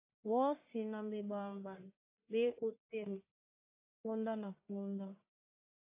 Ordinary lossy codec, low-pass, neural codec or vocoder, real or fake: AAC, 24 kbps; 3.6 kHz; codec, 44.1 kHz, 1.7 kbps, Pupu-Codec; fake